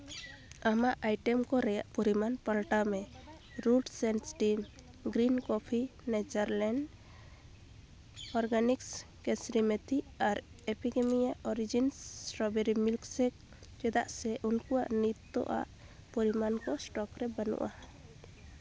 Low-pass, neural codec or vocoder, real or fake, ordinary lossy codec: none; none; real; none